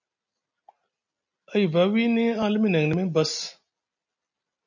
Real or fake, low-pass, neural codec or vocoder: real; 7.2 kHz; none